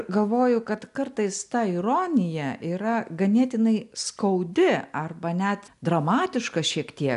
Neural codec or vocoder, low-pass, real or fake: none; 10.8 kHz; real